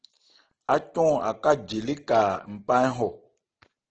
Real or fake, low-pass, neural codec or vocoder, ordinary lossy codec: real; 7.2 kHz; none; Opus, 16 kbps